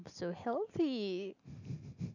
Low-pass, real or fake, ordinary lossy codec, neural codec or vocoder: 7.2 kHz; real; none; none